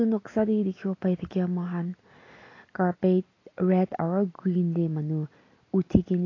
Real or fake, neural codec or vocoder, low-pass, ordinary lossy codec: real; none; 7.2 kHz; AAC, 32 kbps